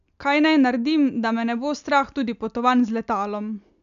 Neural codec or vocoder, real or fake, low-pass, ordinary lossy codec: none; real; 7.2 kHz; none